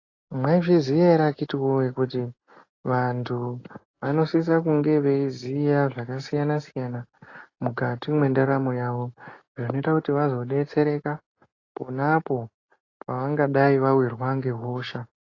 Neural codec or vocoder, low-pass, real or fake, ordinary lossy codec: none; 7.2 kHz; real; AAC, 32 kbps